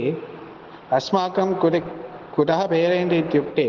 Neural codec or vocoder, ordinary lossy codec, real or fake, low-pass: none; Opus, 16 kbps; real; 7.2 kHz